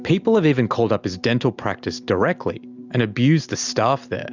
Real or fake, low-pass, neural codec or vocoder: real; 7.2 kHz; none